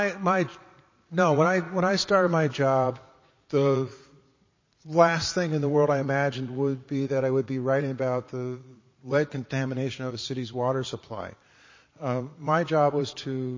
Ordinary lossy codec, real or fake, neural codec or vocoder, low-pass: MP3, 32 kbps; fake; vocoder, 22.05 kHz, 80 mel bands, Vocos; 7.2 kHz